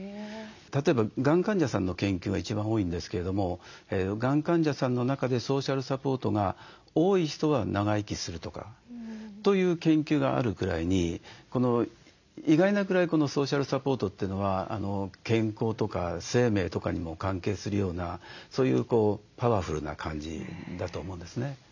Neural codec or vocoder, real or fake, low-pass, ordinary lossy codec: none; real; 7.2 kHz; none